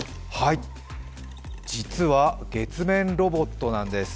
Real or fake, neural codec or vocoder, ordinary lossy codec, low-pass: real; none; none; none